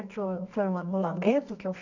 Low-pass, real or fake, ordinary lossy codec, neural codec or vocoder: 7.2 kHz; fake; none; codec, 24 kHz, 0.9 kbps, WavTokenizer, medium music audio release